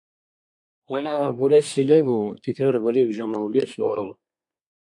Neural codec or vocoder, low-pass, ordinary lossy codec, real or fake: codec, 24 kHz, 1 kbps, SNAC; 10.8 kHz; AAC, 64 kbps; fake